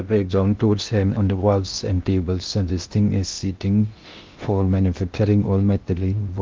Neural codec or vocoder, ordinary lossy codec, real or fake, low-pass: codec, 16 kHz in and 24 kHz out, 0.6 kbps, FocalCodec, streaming, 2048 codes; Opus, 32 kbps; fake; 7.2 kHz